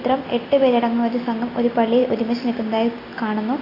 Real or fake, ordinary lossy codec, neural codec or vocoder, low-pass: real; AAC, 24 kbps; none; 5.4 kHz